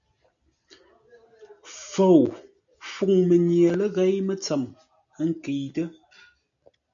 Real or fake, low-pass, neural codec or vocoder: real; 7.2 kHz; none